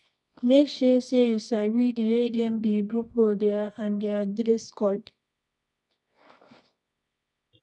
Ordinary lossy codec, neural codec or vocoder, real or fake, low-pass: none; codec, 24 kHz, 0.9 kbps, WavTokenizer, medium music audio release; fake; none